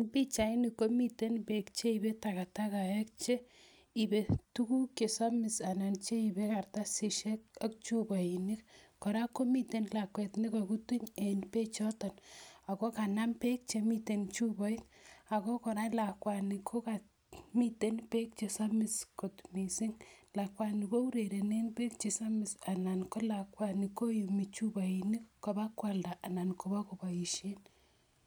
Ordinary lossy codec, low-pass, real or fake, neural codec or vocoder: none; none; real; none